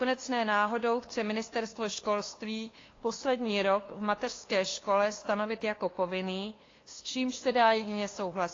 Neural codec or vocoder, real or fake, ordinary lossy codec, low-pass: codec, 16 kHz, 2 kbps, FunCodec, trained on LibriTTS, 25 frames a second; fake; AAC, 32 kbps; 7.2 kHz